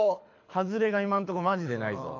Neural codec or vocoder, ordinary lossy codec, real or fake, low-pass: codec, 24 kHz, 6 kbps, HILCodec; AAC, 48 kbps; fake; 7.2 kHz